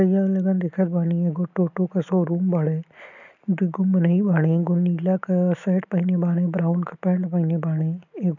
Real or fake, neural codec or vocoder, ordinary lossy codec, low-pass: real; none; none; 7.2 kHz